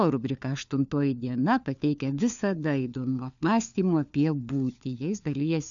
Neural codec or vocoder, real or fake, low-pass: codec, 16 kHz, 4 kbps, FunCodec, trained on LibriTTS, 50 frames a second; fake; 7.2 kHz